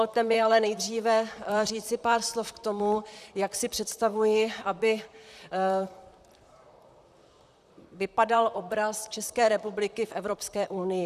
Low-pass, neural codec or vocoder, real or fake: 14.4 kHz; vocoder, 44.1 kHz, 128 mel bands, Pupu-Vocoder; fake